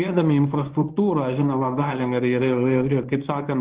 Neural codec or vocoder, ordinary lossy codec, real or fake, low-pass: codec, 24 kHz, 0.9 kbps, WavTokenizer, medium speech release version 1; Opus, 16 kbps; fake; 3.6 kHz